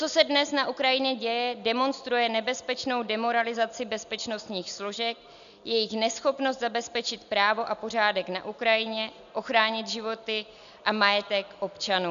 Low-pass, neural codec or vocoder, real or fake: 7.2 kHz; none; real